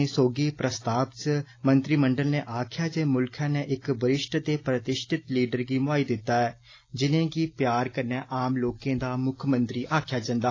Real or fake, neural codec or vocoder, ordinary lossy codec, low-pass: real; none; AAC, 32 kbps; 7.2 kHz